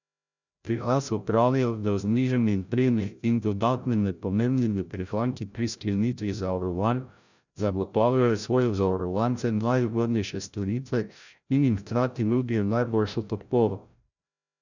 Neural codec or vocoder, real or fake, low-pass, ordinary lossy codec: codec, 16 kHz, 0.5 kbps, FreqCodec, larger model; fake; 7.2 kHz; none